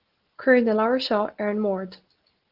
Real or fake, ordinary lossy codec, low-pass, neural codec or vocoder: real; Opus, 16 kbps; 5.4 kHz; none